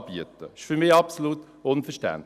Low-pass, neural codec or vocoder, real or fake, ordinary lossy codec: 14.4 kHz; none; real; none